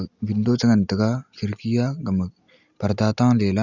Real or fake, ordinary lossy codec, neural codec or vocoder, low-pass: real; none; none; 7.2 kHz